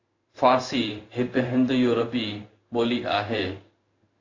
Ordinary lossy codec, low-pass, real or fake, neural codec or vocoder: AAC, 48 kbps; 7.2 kHz; fake; codec, 16 kHz in and 24 kHz out, 1 kbps, XY-Tokenizer